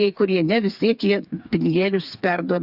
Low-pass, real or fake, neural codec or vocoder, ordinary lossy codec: 5.4 kHz; fake; codec, 16 kHz, 4 kbps, FreqCodec, smaller model; Opus, 64 kbps